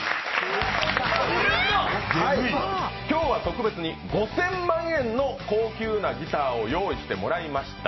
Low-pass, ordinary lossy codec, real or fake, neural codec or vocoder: 7.2 kHz; MP3, 24 kbps; real; none